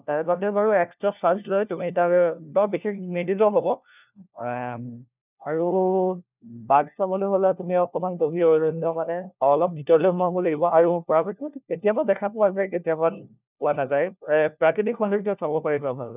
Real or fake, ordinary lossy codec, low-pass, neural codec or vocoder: fake; none; 3.6 kHz; codec, 16 kHz, 1 kbps, FunCodec, trained on LibriTTS, 50 frames a second